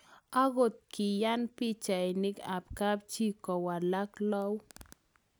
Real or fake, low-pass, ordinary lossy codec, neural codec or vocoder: real; none; none; none